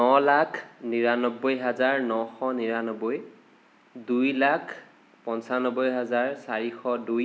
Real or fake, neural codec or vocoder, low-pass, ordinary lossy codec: real; none; none; none